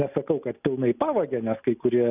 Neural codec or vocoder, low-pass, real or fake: none; 3.6 kHz; real